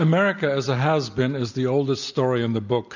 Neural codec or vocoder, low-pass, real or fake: none; 7.2 kHz; real